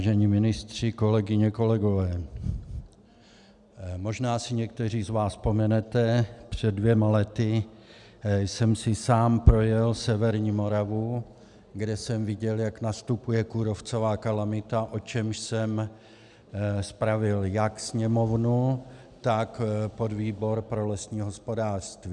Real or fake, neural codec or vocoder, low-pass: real; none; 10.8 kHz